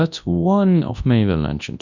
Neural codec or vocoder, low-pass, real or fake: codec, 24 kHz, 0.9 kbps, WavTokenizer, large speech release; 7.2 kHz; fake